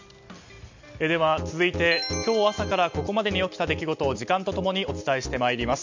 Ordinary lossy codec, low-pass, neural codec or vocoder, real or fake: MP3, 64 kbps; 7.2 kHz; none; real